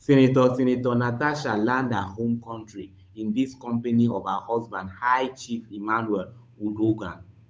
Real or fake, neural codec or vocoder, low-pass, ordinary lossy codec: fake; codec, 16 kHz, 8 kbps, FunCodec, trained on Chinese and English, 25 frames a second; none; none